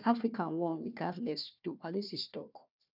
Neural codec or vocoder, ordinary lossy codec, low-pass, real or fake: codec, 16 kHz, 1 kbps, FunCodec, trained on Chinese and English, 50 frames a second; none; 5.4 kHz; fake